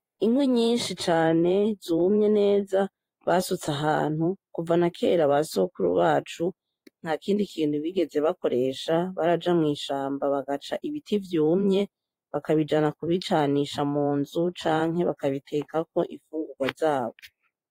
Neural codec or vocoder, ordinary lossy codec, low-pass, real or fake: vocoder, 44.1 kHz, 128 mel bands every 512 samples, BigVGAN v2; AAC, 48 kbps; 19.8 kHz; fake